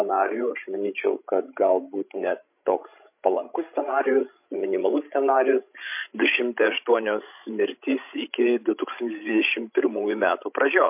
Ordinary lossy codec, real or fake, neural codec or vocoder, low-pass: MP3, 32 kbps; fake; codec, 16 kHz, 16 kbps, FreqCodec, larger model; 3.6 kHz